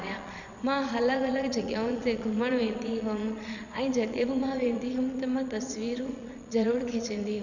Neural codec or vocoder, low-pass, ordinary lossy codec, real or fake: vocoder, 22.05 kHz, 80 mel bands, Vocos; 7.2 kHz; none; fake